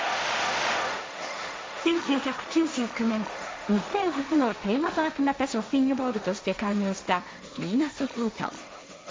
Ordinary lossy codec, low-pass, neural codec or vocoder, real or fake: none; none; codec, 16 kHz, 1.1 kbps, Voila-Tokenizer; fake